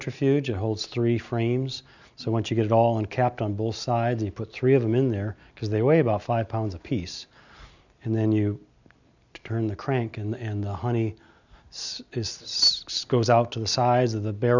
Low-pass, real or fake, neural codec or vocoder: 7.2 kHz; real; none